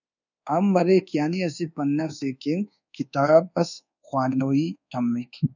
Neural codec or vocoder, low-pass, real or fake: codec, 24 kHz, 1.2 kbps, DualCodec; 7.2 kHz; fake